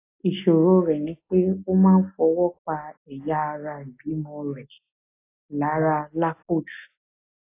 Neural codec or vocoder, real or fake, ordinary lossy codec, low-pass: none; real; AAC, 24 kbps; 3.6 kHz